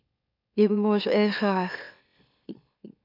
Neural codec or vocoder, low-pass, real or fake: autoencoder, 44.1 kHz, a latent of 192 numbers a frame, MeloTTS; 5.4 kHz; fake